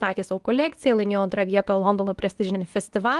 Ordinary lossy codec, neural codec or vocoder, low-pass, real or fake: Opus, 24 kbps; codec, 24 kHz, 0.9 kbps, WavTokenizer, medium speech release version 2; 10.8 kHz; fake